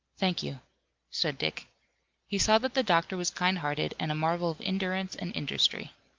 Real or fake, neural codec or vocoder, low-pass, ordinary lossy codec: real; none; 7.2 kHz; Opus, 24 kbps